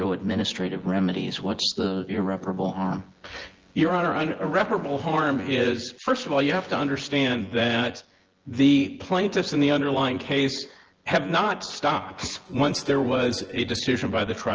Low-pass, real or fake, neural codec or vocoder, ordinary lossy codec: 7.2 kHz; fake; vocoder, 24 kHz, 100 mel bands, Vocos; Opus, 16 kbps